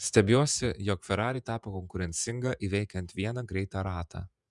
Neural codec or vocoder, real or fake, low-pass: vocoder, 48 kHz, 128 mel bands, Vocos; fake; 10.8 kHz